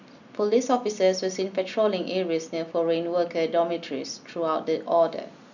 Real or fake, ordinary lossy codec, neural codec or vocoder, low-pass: real; none; none; 7.2 kHz